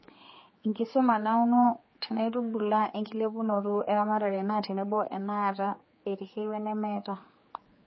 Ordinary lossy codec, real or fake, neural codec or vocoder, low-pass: MP3, 24 kbps; fake; codec, 16 kHz, 4 kbps, X-Codec, HuBERT features, trained on general audio; 7.2 kHz